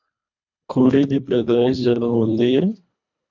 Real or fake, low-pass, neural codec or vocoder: fake; 7.2 kHz; codec, 24 kHz, 1.5 kbps, HILCodec